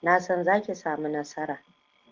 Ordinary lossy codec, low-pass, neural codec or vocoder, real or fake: Opus, 16 kbps; 7.2 kHz; none; real